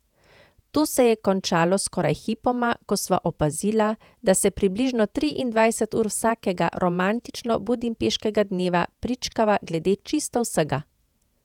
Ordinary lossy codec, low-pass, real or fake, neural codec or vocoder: none; 19.8 kHz; fake; vocoder, 48 kHz, 128 mel bands, Vocos